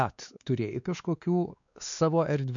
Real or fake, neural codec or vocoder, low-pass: fake; codec, 16 kHz, 2 kbps, X-Codec, WavLM features, trained on Multilingual LibriSpeech; 7.2 kHz